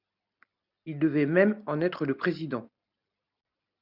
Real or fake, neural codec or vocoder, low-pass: real; none; 5.4 kHz